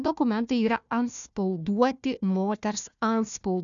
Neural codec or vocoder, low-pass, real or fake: codec, 16 kHz, 1 kbps, FunCodec, trained on Chinese and English, 50 frames a second; 7.2 kHz; fake